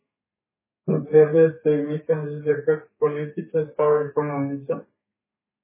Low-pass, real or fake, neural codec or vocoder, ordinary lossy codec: 3.6 kHz; fake; codec, 32 kHz, 1.9 kbps, SNAC; MP3, 16 kbps